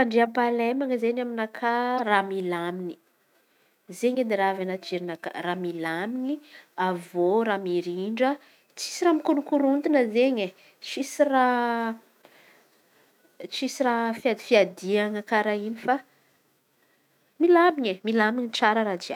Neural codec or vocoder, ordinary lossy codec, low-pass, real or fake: autoencoder, 48 kHz, 128 numbers a frame, DAC-VAE, trained on Japanese speech; none; 19.8 kHz; fake